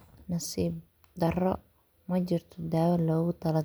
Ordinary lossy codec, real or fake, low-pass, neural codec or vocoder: none; real; none; none